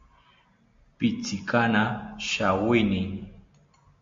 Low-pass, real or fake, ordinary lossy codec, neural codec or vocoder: 7.2 kHz; real; MP3, 48 kbps; none